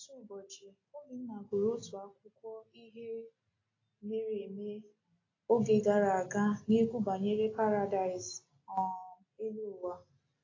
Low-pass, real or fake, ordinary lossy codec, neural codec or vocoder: 7.2 kHz; real; AAC, 32 kbps; none